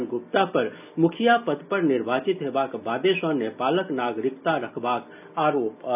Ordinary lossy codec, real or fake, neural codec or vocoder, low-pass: none; real; none; 3.6 kHz